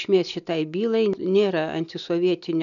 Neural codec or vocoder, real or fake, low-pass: none; real; 7.2 kHz